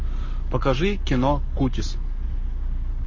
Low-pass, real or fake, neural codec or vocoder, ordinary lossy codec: 7.2 kHz; fake; codec, 44.1 kHz, 7.8 kbps, Pupu-Codec; MP3, 32 kbps